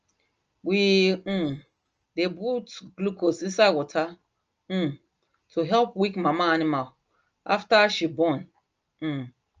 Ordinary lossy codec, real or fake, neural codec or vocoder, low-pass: Opus, 24 kbps; real; none; 7.2 kHz